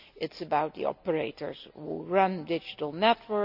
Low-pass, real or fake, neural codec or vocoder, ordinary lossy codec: 5.4 kHz; real; none; none